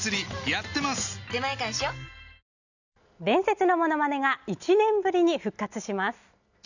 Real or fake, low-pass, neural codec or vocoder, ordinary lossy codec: real; 7.2 kHz; none; none